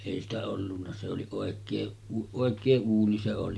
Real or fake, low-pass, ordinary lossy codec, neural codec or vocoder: real; none; none; none